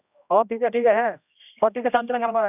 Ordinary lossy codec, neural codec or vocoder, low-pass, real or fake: none; codec, 16 kHz, 1 kbps, X-Codec, HuBERT features, trained on general audio; 3.6 kHz; fake